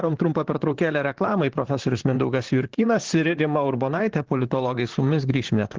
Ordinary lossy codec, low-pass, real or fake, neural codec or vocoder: Opus, 16 kbps; 7.2 kHz; fake; vocoder, 44.1 kHz, 128 mel bands, Pupu-Vocoder